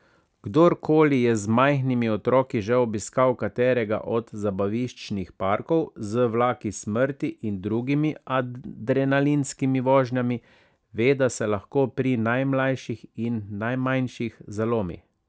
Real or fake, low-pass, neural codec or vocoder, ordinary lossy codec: real; none; none; none